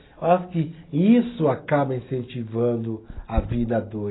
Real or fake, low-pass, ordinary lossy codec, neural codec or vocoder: real; 7.2 kHz; AAC, 16 kbps; none